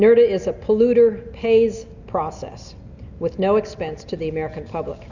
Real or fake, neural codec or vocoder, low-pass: real; none; 7.2 kHz